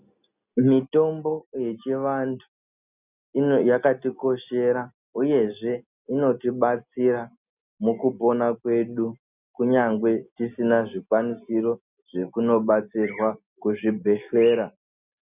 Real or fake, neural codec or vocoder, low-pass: real; none; 3.6 kHz